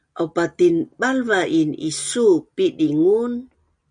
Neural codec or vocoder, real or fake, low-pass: none; real; 9.9 kHz